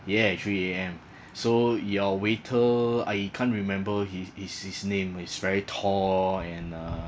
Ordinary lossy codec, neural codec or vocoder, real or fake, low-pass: none; none; real; none